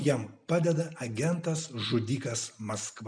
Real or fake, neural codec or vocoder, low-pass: real; none; 9.9 kHz